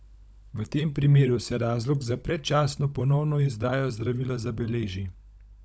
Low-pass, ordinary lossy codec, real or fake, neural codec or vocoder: none; none; fake; codec, 16 kHz, 16 kbps, FunCodec, trained on LibriTTS, 50 frames a second